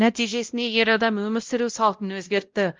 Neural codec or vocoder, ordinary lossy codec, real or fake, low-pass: codec, 16 kHz, 0.5 kbps, X-Codec, WavLM features, trained on Multilingual LibriSpeech; Opus, 32 kbps; fake; 7.2 kHz